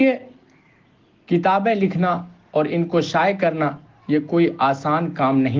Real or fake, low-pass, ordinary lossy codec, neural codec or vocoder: real; 7.2 kHz; Opus, 16 kbps; none